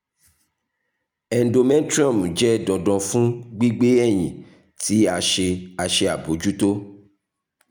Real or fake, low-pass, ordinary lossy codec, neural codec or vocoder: real; none; none; none